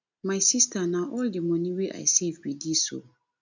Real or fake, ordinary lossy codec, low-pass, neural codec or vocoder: real; none; 7.2 kHz; none